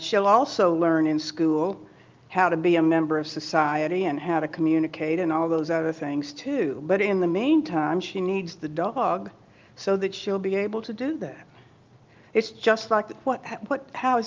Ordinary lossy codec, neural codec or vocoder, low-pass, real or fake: Opus, 32 kbps; none; 7.2 kHz; real